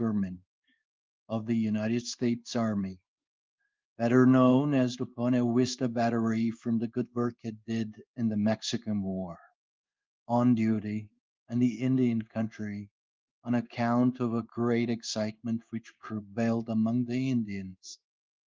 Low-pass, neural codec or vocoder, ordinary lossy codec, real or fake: 7.2 kHz; codec, 16 kHz in and 24 kHz out, 1 kbps, XY-Tokenizer; Opus, 32 kbps; fake